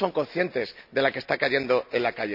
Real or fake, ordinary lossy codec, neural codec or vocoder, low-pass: real; none; none; 5.4 kHz